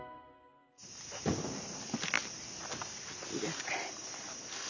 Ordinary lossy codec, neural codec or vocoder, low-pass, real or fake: none; none; 7.2 kHz; real